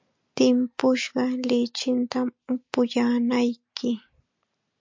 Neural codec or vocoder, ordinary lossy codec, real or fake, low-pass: none; MP3, 48 kbps; real; 7.2 kHz